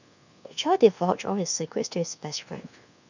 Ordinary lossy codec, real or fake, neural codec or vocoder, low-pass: none; fake; codec, 24 kHz, 1.2 kbps, DualCodec; 7.2 kHz